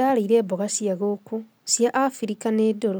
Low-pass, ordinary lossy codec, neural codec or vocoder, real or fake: none; none; none; real